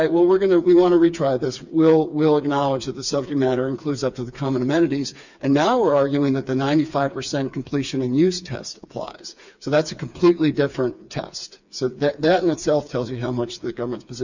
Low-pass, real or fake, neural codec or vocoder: 7.2 kHz; fake; codec, 16 kHz, 4 kbps, FreqCodec, smaller model